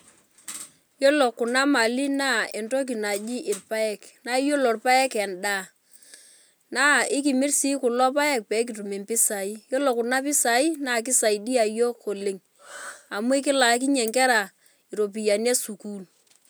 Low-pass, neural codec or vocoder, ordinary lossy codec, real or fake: none; none; none; real